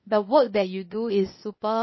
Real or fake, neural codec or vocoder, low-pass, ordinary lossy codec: fake; codec, 16 kHz, 0.8 kbps, ZipCodec; 7.2 kHz; MP3, 24 kbps